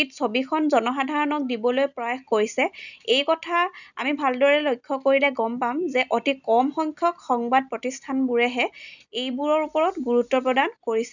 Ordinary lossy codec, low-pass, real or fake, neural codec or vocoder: none; 7.2 kHz; real; none